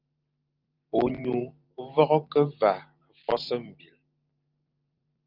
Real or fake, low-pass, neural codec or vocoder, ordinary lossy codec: fake; 5.4 kHz; vocoder, 44.1 kHz, 128 mel bands every 512 samples, BigVGAN v2; Opus, 24 kbps